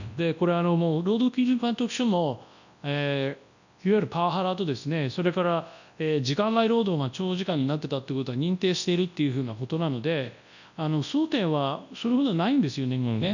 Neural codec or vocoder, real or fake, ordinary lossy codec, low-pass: codec, 24 kHz, 0.9 kbps, WavTokenizer, large speech release; fake; none; 7.2 kHz